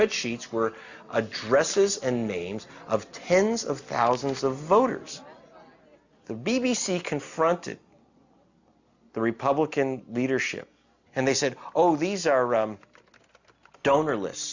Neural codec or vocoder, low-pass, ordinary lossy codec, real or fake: none; 7.2 kHz; Opus, 64 kbps; real